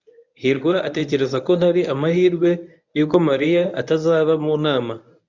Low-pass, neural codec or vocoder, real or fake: 7.2 kHz; codec, 24 kHz, 0.9 kbps, WavTokenizer, medium speech release version 1; fake